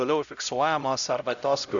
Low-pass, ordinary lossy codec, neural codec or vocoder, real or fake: 7.2 kHz; MP3, 96 kbps; codec, 16 kHz, 0.5 kbps, X-Codec, HuBERT features, trained on LibriSpeech; fake